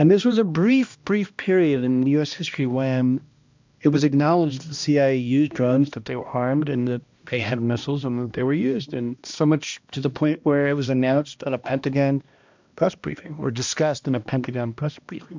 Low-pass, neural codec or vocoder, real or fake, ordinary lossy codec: 7.2 kHz; codec, 16 kHz, 1 kbps, X-Codec, HuBERT features, trained on balanced general audio; fake; MP3, 64 kbps